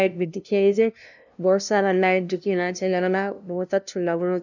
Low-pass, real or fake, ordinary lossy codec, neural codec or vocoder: 7.2 kHz; fake; none; codec, 16 kHz, 0.5 kbps, FunCodec, trained on LibriTTS, 25 frames a second